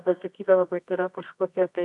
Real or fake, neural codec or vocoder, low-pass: fake; codec, 24 kHz, 0.9 kbps, WavTokenizer, medium music audio release; 10.8 kHz